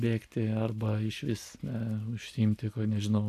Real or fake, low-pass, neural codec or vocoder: real; 14.4 kHz; none